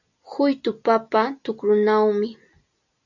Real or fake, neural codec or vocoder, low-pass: real; none; 7.2 kHz